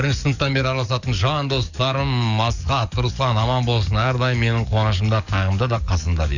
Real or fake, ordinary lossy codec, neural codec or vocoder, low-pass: fake; none; codec, 44.1 kHz, 7.8 kbps, DAC; 7.2 kHz